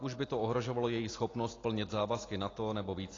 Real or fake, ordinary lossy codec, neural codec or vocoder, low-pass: real; AAC, 32 kbps; none; 7.2 kHz